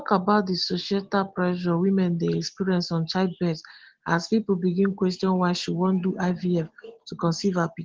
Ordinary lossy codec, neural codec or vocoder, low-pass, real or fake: Opus, 16 kbps; none; 7.2 kHz; real